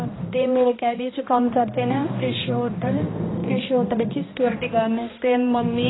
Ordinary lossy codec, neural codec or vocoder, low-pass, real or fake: AAC, 16 kbps; codec, 16 kHz, 1 kbps, X-Codec, HuBERT features, trained on balanced general audio; 7.2 kHz; fake